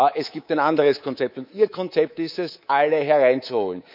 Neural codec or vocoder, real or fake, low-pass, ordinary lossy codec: codec, 24 kHz, 3.1 kbps, DualCodec; fake; 5.4 kHz; none